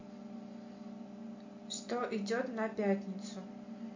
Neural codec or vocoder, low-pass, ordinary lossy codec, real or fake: none; 7.2 kHz; MP3, 48 kbps; real